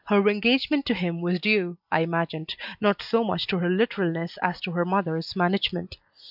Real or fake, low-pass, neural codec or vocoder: real; 5.4 kHz; none